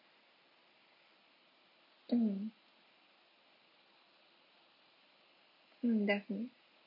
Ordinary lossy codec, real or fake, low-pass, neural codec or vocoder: none; real; 5.4 kHz; none